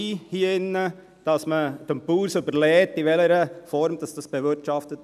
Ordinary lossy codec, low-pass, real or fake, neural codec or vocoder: none; 14.4 kHz; real; none